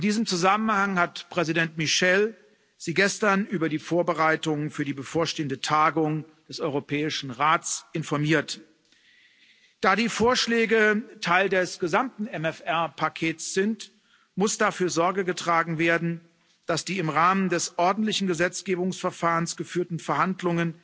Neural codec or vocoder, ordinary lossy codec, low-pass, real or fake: none; none; none; real